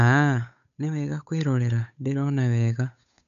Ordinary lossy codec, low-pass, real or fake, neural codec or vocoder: none; 7.2 kHz; fake; codec, 16 kHz, 8 kbps, FunCodec, trained on Chinese and English, 25 frames a second